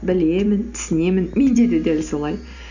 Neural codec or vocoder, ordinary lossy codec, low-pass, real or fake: none; none; 7.2 kHz; real